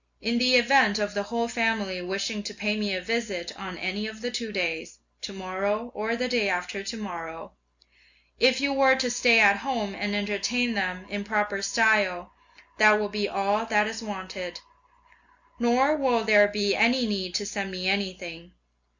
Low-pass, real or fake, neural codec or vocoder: 7.2 kHz; real; none